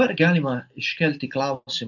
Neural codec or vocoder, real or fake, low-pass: none; real; 7.2 kHz